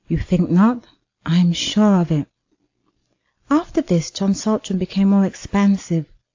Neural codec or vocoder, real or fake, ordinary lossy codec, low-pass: none; real; AAC, 48 kbps; 7.2 kHz